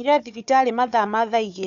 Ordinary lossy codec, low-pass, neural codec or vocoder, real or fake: MP3, 64 kbps; 7.2 kHz; codec, 16 kHz, 4 kbps, FunCodec, trained on Chinese and English, 50 frames a second; fake